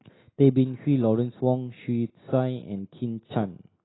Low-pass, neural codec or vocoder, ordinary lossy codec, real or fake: 7.2 kHz; none; AAC, 16 kbps; real